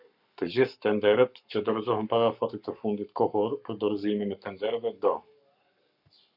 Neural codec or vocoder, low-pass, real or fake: codec, 44.1 kHz, 7.8 kbps, Pupu-Codec; 5.4 kHz; fake